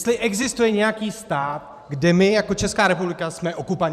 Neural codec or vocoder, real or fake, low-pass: vocoder, 44.1 kHz, 128 mel bands every 512 samples, BigVGAN v2; fake; 14.4 kHz